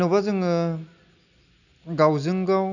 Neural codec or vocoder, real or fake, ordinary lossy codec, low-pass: none; real; none; 7.2 kHz